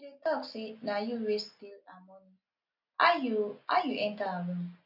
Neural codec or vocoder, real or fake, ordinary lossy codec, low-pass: none; real; none; 5.4 kHz